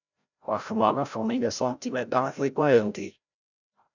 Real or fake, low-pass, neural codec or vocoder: fake; 7.2 kHz; codec, 16 kHz, 0.5 kbps, FreqCodec, larger model